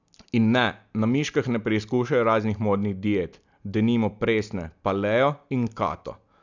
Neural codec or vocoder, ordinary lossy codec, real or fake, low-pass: none; none; real; 7.2 kHz